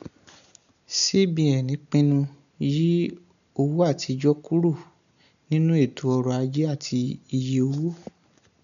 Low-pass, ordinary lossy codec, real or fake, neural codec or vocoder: 7.2 kHz; none; real; none